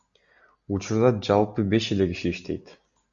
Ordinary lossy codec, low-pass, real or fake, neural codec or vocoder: Opus, 64 kbps; 7.2 kHz; real; none